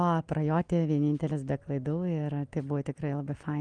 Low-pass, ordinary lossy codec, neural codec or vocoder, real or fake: 9.9 kHz; Opus, 24 kbps; none; real